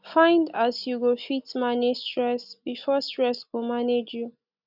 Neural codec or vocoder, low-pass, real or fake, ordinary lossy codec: none; 5.4 kHz; real; none